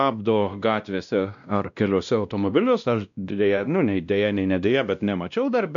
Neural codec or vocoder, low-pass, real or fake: codec, 16 kHz, 1 kbps, X-Codec, WavLM features, trained on Multilingual LibriSpeech; 7.2 kHz; fake